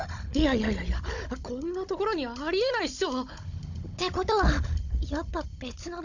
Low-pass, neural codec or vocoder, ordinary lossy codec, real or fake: 7.2 kHz; codec, 16 kHz, 16 kbps, FunCodec, trained on Chinese and English, 50 frames a second; none; fake